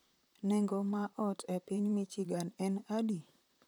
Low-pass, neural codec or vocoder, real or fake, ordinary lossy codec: none; vocoder, 44.1 kHz, 128 mel bands, Pupu-Vocoder; fake; none